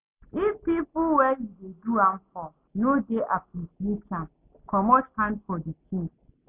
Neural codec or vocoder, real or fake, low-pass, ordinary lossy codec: none; real; 3.6 kHz; none